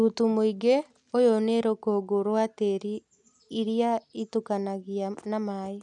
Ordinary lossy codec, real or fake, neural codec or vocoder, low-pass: none; real; none; 10.8 kHz